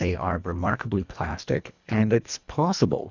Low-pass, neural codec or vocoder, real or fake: 7.2 kHz; codec, 24 kHz, 1.5 kbps, HILCodec; fake